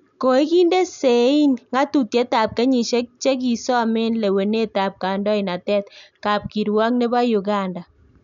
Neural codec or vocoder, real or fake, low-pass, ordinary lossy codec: none; real; 7.2 kHz; none